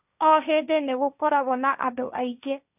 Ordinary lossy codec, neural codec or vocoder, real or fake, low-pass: none; codec, 16 kHz, 1.1 kbps, Voila-Tokenizer; fake; 3.6 kHz